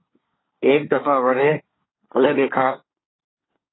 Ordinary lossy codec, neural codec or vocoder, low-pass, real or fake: AAC, 16 kbps; codec, 24 kHz, 1 kbps, SNAC; 7.2 kHz; fake